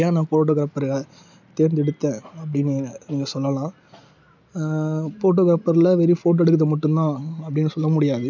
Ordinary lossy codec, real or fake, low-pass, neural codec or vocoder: none; real; 7.2 kHz; none